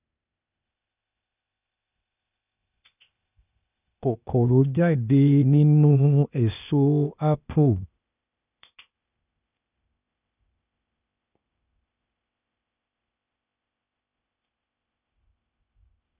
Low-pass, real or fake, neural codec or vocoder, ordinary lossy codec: 3.6 kHz; fake; codec, 16 kHz, 0.8 kbps, ZipCodec; none